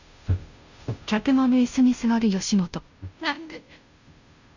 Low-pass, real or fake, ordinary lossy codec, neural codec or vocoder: 7.2 kHz; fake; none; codec, 16 kHz, 0.5 kbps, FunCodec, trained on Chinese and English, 25 frames a second